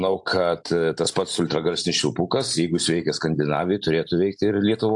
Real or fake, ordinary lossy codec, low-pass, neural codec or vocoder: real; AAC, 64 kbps; 10.8 kHz; none